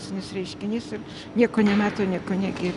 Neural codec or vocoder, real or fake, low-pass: none; real; 10.8 kHz